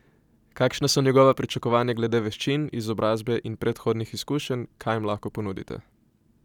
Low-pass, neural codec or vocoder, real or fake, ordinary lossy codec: 19.8 kHz; none; real; none